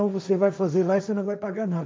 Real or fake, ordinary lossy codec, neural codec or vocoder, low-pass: fake; none; codec, 16 kHz, 1.1 kbps, Voila-Tokenizer; none